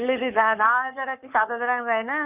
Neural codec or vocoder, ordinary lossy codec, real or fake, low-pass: codec, 44.1 kHz, 7.8 kbps, Pupu-Codec; none; fake; 3.6 kHz